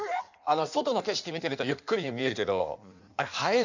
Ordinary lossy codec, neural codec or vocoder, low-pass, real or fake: none; codec, 16 kHz in and 24 kHz out, 1.1 kbps, FireRedTTS-2 codec; 7.2 kHz; fake